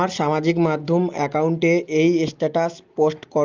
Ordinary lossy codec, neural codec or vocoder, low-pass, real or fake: Opus, 32 kbps; none; 7.2 kHz; real